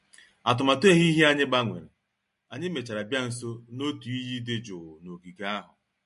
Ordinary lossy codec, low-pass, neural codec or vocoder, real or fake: MP3, 48 kbps; 14.4 kHz; none; real